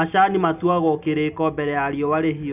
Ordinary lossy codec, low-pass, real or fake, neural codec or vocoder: none; 3.6 kHz; real; none